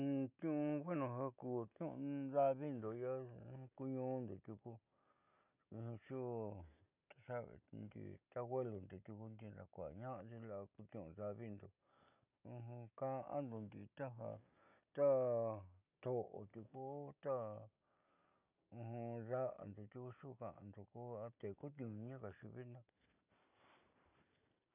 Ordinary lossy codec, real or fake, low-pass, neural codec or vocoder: none; real; 3.6 kHz; none